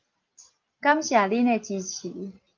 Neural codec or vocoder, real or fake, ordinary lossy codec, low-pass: none; real; Opus, 24 kbps; 7.2 kHz